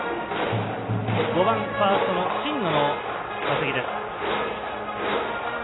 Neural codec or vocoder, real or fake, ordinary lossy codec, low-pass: none; real; AAC, 16 kbps; 7.2 kHz